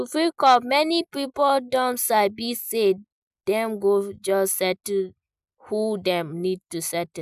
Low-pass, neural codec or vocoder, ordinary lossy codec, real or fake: 14.4 kHz; none; none; real